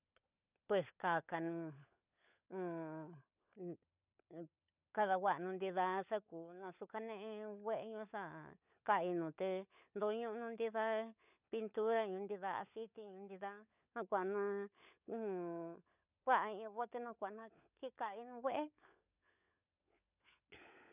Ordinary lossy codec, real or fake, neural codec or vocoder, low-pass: none; fake; codec, 16 kHz, 16 kbps, FunCodec, trained on LibriTTS, 50 frames a second; 3.6 kHz